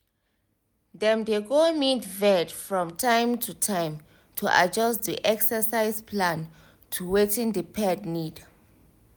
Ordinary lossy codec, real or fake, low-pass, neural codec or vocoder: none; real; none; none